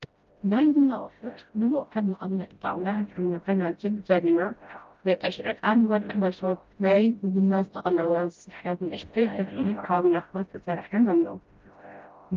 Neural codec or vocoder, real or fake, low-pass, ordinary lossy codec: codec, 16 kHz, 0.5 kbps, FreqCodec, smaller model; fake; 7.2 kHz; Opus, 32 kbps